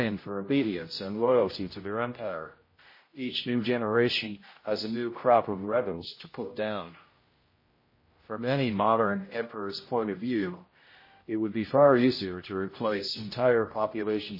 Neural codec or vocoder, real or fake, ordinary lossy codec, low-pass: codec, 16 kHz, 0.5 kbps, X-Codec, HuBERT features, trained on general audio; fake; MP3, 24 kbps; 5.4 kHz